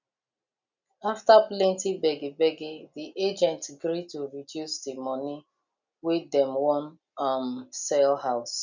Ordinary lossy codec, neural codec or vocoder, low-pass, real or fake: none; none; 7.2 kHz; real